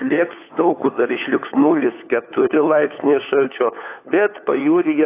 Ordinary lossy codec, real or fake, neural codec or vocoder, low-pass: AAC, 24 kbps; fake; codec, 16 kHz, 4 kbps, FunCodec, trained on LibriTTS, 50 frames a second; 3.6 kHz